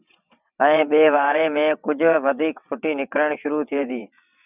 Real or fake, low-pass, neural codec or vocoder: fake; 3.6 kHz; vocoder, 22.05 kHz, 80 mel bands, WaveNeXt